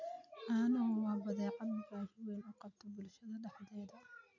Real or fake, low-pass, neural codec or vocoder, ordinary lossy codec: real; 7.2 kHz; none; none